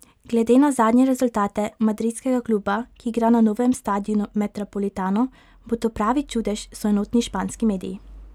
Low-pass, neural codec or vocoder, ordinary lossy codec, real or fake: 19.8 kHz; none; none; real